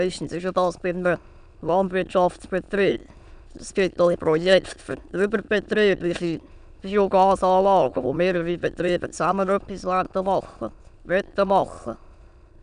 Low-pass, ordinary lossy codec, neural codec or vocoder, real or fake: 9.9 kHz; none; autoencoder, 22.05 kHz, a latent of 192 numbers a frame, VITS, trained on many speakers; fake